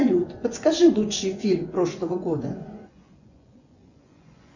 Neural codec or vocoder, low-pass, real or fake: vocoder, 24 kHz, 100 mel bands, Vocos; 7.2 kHz; fake